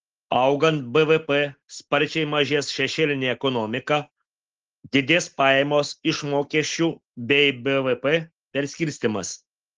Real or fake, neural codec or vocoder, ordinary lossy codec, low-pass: real; none; Opus, 16 kbps; 7.2 kHz